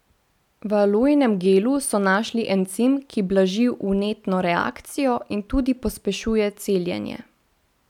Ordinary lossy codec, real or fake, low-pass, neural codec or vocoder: none; real; 19.8 kHz; none